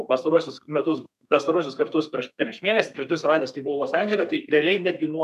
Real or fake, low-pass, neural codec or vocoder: fake; 14.4 kHz; codec, 32 kHz, 1.9 kbps, SNAC